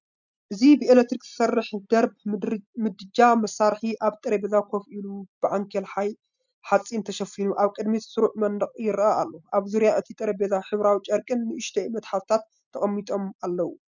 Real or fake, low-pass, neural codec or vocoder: real; 7.2 kHz; none